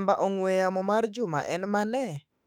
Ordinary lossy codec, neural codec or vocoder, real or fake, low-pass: none; autoencoder, 48 kHz, 32 numbers a frame, DAC-VAE, trained on Japanese speech; fake; 19.8 kHz